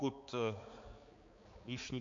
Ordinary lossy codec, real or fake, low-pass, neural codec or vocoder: MP3, 48 kbps; fake; 7.2 kHz; codec, 16 kHz, 4 kbps, X-Codec, HuBERT features, trained on balanced general audio